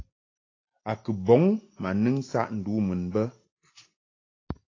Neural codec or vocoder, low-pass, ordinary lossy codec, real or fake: none; 7.2 kHz; AAC, 32 kbps; real